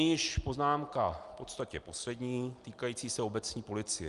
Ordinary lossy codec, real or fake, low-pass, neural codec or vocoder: Opus, 32 kbps; real; 10.8 kHz; none